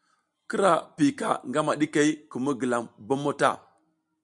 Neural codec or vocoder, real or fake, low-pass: none; real; 10.8 kHz